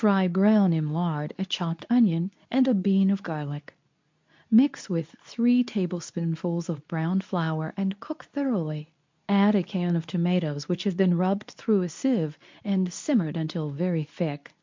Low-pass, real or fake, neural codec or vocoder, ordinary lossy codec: 7.2 kHz; fake; codec, 24 kHz, 0.9 kbps, WavTokenizer, medium speech release version 2; MP3, 64 kbps